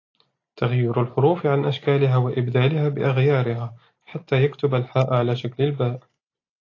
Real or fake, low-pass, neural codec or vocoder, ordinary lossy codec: real; 7.2 kHz; none; AAC, 32 kbps